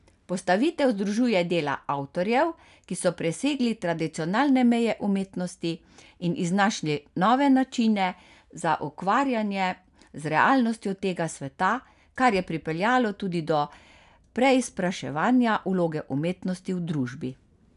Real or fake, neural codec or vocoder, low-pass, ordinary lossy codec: real; none; 10.8 kHz; none